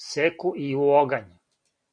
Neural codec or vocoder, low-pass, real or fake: none; 9.9 kHz; real